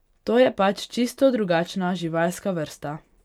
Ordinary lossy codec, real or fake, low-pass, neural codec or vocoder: none; real; 19.8 kHz; none